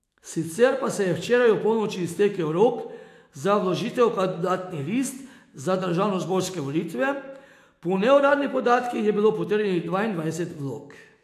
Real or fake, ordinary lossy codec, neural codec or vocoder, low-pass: fake; AAC, 64 kbps; autoencoder, 48 kHz, 128 numbers a frame, DAC-VAE, trained on Japanese speech; 14.4 kHz